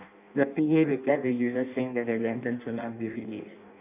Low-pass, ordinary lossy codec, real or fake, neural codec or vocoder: 3.6 kHz; none; fake; codec, 16 kHz in and 24 kHz out, 0.6 kbps, FireRedTTS-2 codec